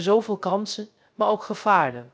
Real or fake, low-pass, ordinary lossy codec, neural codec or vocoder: fake; none; none; codec, 16 kHz, 0.3 kbps, FocalCodec